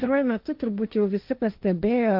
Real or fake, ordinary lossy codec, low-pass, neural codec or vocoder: fake; Opus, 32 kbps; 5.4 kHz; codec, 16 kHz, 1.1 kbps, Voila-Tokenizer